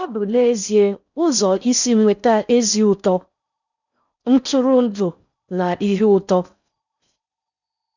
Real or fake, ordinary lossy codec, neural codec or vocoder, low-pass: fake; none; codec, 16 kHz in and 24 kHz out, 0.6 kbps, FocalCodec, streaming, 4096 codes; 7.2 kHz